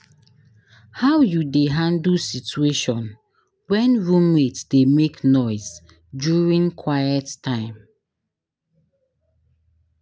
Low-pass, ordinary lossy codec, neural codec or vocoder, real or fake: none; none; none; real